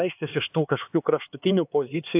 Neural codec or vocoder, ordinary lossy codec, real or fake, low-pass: codec, 16 kHz, 4 kbps, X-Codec, HuBERT features, trained on LibriSpeech; AAC, 32 kbps; fake; 3.6 kHz